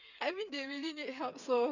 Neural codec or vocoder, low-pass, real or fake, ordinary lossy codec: codec, 16 kHz, 8 kbps, FreqCodec, smaller model; 7.2 kHz; fake; none